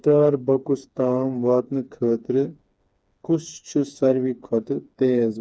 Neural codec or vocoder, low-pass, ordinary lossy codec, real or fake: codec, 16 kHz, 4 kbps, FreqCodec, smaller model; none; none; fake